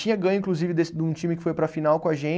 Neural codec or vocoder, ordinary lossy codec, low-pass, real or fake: none; none; none; real